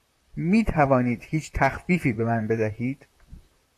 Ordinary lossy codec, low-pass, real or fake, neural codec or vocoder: AAC, 64 kbps; 14.4 kHz; fake; vocoder, 44.1 kHz, 128 mel bands, Pupu-Vocoder